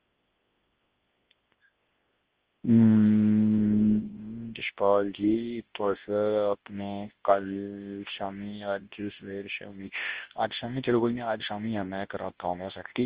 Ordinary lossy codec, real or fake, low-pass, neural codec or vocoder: Opus, 16 kbps; fake; 3.6 kHz; codec, 24 kHz, 0.9 kbps, WavTokenizer, large speech release